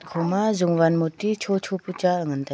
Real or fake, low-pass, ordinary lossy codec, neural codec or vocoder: real; none; none; none